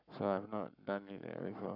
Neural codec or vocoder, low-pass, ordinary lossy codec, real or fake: codec, 44.1 kHz, 7.8 kbps, Pupu-Codec; 5.4 kHz; none; fake